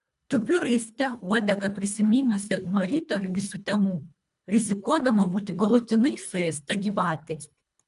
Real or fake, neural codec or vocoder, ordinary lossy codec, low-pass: fake; codec, 24 kHz, 1.5 kbps, HILCodec; MP3, 96 kbps; 10.8 kHz